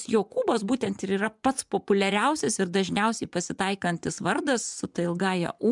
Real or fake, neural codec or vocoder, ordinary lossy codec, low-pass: fake; vocoder, 24 kHz, 100 mel bands, Vocos; MP3, 96 kbps; 10.8 kHz